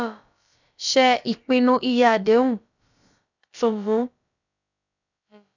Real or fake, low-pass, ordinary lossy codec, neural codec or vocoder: fake; 7.2 kHz; none; codec, 16 kHz, about 1 kbps, DyCAST, with the encoder's durations